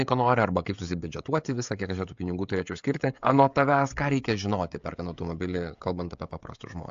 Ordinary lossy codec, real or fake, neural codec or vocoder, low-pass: AAC, 64 kbps; fake; codec, 16 kHz, 16 kbps, FreqCodec, smaller model; 7.2 kHz